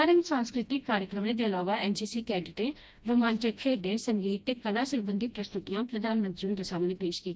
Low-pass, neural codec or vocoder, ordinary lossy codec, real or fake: none; codec, 16 kHz, 1 kbps, FreqCodec, smaller model; none; fake